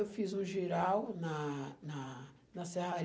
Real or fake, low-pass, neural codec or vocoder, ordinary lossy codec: real; none; none; none